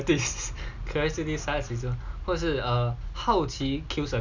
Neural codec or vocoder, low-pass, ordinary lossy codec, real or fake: none; 7.2 kHz; none; real